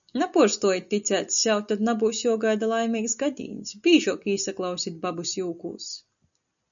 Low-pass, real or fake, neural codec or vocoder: 7.2 kHz; real; none